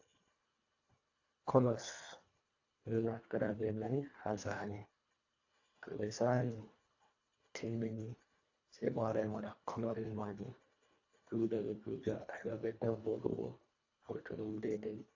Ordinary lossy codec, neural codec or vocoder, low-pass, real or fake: AAC, 48 kbps; codec, 24 kHz, 1.5 kbps, HILCodec; 7.2 kHz; fake